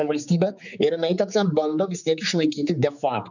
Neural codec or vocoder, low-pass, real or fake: codec, 16 kHz, 4 kbps, X-Codec, HuBERT features, trained on general audio; 7.2 kHz; fake